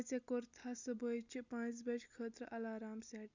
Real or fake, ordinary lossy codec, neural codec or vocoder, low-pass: real; none; none; 7.2 kHz